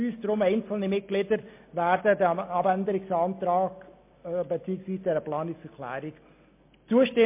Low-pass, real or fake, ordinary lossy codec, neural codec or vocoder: 3.6 kHz; real; MP3, 24 kbps; none